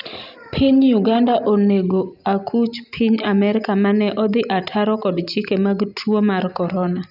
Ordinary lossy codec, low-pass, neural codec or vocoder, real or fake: none; 5.4 kHz; none; real